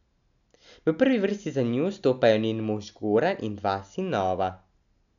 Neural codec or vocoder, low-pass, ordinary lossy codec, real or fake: none; 7.2 kHz; none; real